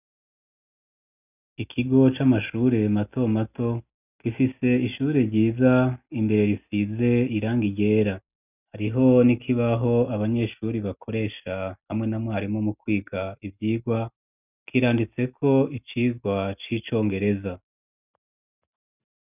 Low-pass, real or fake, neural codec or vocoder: 3.6 kHz; real; none